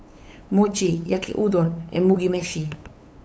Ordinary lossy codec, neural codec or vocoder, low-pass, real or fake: none; codec, 16 kHz, 8 kbps, FunCodec, trained on LibriTTS, 25 frames a second; none; fake